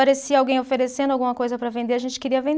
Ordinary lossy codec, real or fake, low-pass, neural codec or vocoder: none; real; none; none